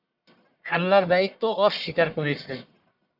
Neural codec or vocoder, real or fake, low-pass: codec, 44.1 kHz, 1.7 kbps, Pupu-Codec; fake; 5.4 kHz